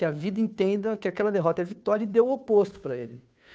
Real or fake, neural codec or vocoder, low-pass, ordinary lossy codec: fake; codec, 16 kHz, 2 kbps, FunCodec, trained on Chinese and English, 25 frames a second; none; none